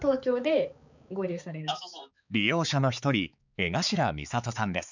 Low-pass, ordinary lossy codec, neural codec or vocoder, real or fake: 7.2 kHz; none; codec, 16 kHz, 4 kbps, X-Codec, HuBERT features, trained on balanced general audio; fake